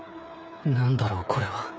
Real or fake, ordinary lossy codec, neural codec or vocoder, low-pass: fake; none; codec, 16 kHz, 8 kbps, FreqCodec, smaller model; none